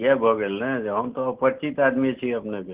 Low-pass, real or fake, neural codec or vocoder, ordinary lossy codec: 3.6 kHz; real; none; Opus, 16 kbps